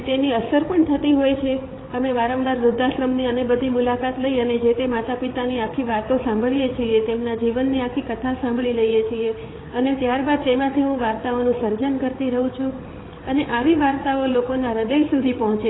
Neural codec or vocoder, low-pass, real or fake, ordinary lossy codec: codec, 16 kHz, 8 kbps, FreqCodec, larger model; 7.2 kHz; fake; AAC, 16 kbps